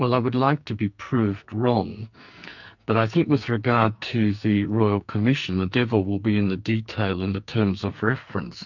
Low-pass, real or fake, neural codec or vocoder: 7.2 kHz; fake; codec, 44.1 kHz, 2.6 kbps, SNAC